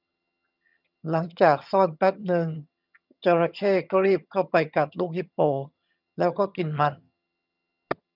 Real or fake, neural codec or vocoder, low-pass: fake; vocoder, 22.05 kHz, 80 mel bands, HiFi-GAN; 5.4 kHz